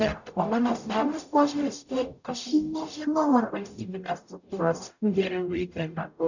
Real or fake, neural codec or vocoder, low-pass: fake; codec, 44.1 kHz, 0.9 kbps, DAC; 7.2 kHz